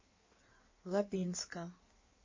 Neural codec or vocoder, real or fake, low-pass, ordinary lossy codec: codec, 16 kHz in and 24 kHz out, 1.1 kbps, FireRedTTS-2 codec; fake; 7.2 kHz; MP3, 32 kbps